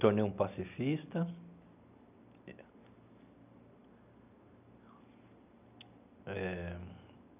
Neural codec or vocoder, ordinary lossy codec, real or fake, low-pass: none; none; real; 3.6 kHz